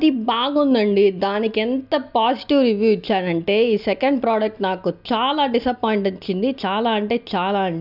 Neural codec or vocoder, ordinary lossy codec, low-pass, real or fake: none; none; 5.4 kHz; real